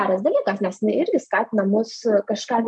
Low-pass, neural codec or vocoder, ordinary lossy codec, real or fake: 10.8 kHz; none; MP3, 64 kbps; real